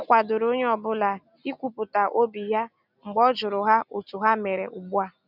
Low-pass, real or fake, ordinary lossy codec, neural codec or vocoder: 5.4 kHz; real; none; none